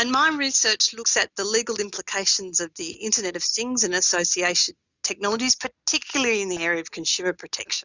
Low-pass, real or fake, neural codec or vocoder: 7.2 kHz; real; none